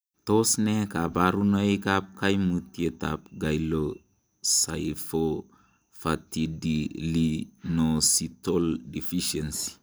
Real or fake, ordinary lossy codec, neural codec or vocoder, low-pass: real; none; none; none